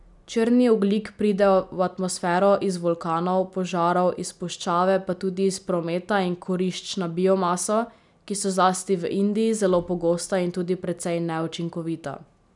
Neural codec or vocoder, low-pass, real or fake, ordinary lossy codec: none; 10.8 kHz; real; none